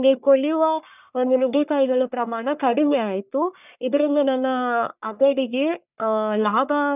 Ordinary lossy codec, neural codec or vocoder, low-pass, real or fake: none; codec, 44.1 kHz, 1.7 kbps, Pupu-Codec; 3.6 kHz; fake